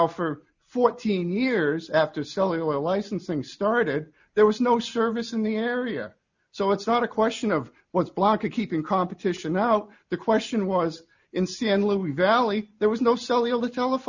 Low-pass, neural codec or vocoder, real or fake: 7.2 kHz; none; real